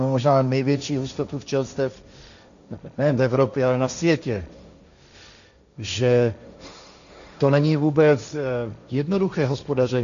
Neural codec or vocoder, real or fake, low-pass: codec, 16 kHz, 1.1 kbps, Voila-Tokenizer; fake; 7.2 kHz